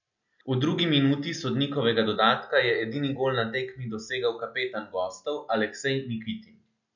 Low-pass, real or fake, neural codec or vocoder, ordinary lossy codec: 7.2 kHz; real; none; none